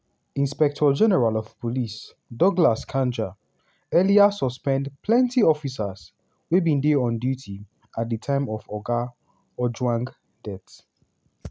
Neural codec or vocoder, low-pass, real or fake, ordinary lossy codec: none; none; real; none